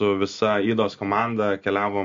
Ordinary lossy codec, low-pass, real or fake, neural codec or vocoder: MP3, 48 kbps; 7.2 kHz; real; none